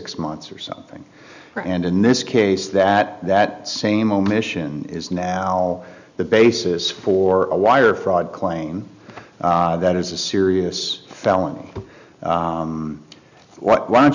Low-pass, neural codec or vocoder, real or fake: 7.2 kHz; none; real